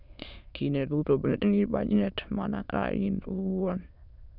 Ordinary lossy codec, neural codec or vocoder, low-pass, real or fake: none; autoencoder, 22.05 kHz, a latent of 192 numbers a frame, VITS, trained on many speakers; 5.4 kHz; fake